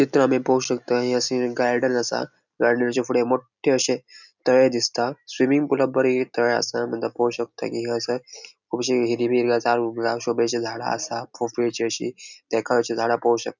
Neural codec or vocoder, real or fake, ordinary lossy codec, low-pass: none; real; none; 7.2 kHz